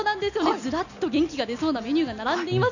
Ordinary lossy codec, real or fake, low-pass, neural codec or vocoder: none; real; 7.2 kHz; none